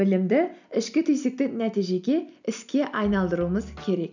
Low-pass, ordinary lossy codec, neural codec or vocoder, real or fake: 7.2 kHz; none; none; real